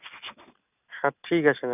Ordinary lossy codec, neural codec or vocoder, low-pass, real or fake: none; none; 3.6 kHz; real